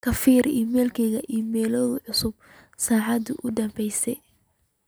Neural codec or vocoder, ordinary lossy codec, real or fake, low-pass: none; none; real; none